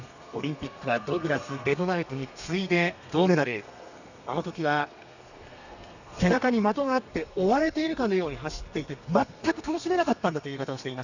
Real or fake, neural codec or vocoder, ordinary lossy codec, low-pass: fake; codec, 32 kHz, 1.9 kbps, SNAC; none; 7.2 kHz